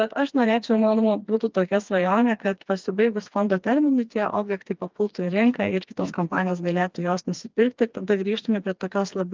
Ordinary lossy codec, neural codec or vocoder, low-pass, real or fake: Opus, 24 kbps; codec, 16 kHz, 2 kbps, FreqCodec, smaller model; 7.2 kHz; fake